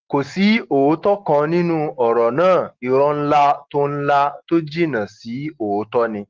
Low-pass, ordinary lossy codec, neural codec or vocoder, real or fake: 7.2 kHz; Opus, 16 kbps; none; real